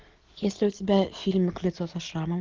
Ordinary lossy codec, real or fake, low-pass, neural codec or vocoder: Opus, 16 kbps; real; 7.2 kHz; none